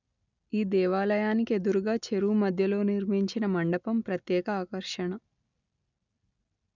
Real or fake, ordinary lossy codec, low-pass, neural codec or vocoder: real; none; 7.2 kHz; none